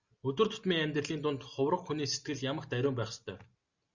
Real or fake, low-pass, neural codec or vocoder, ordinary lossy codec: real; 7.2 kHz; none; Opus, 64 kbps